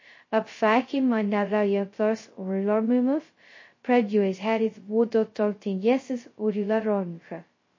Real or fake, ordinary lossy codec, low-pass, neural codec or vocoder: fake; MP3, 32 kbps; 7.2 kHz; codec, 16 kHz, 0.2 kbps, FocalCodec